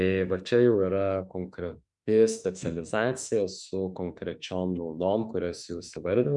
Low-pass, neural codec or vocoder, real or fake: 10.8 kHz; autoencoder, 48 kHz, 32 numbers a frame, DAC-VAE, trained on Japanese speech; fake